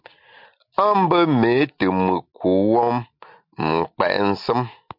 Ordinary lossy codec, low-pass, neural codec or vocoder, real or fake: MP3, 48 kbps; 5.4 kHz; none; real